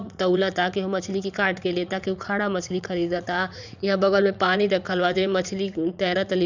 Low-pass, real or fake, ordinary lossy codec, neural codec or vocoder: 7.2 kHz; fake; none; vocoder, 44.1 kHz, 80 mel bands, Vocos